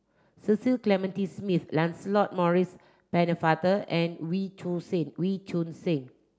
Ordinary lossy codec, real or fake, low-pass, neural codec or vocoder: none; real; none; none